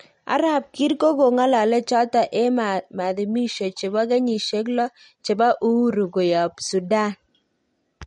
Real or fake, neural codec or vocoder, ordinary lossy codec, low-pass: real; none; MP3, 48 kbps; 19.8 kHz